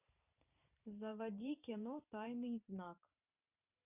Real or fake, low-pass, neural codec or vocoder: fake; 3.6 kHz; vocoder, 44.1 kHz, 128 mel bands every 512 samples, BigVGAN v2